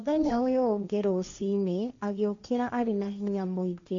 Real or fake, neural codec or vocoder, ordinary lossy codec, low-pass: fake; codec, 16 kHz, 1.1 kbps, Voila-Tokenizer; MP3, 64 kbps; 7.2 kHz